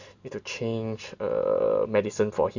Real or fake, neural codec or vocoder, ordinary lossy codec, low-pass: real; none; none; 7.2 kHz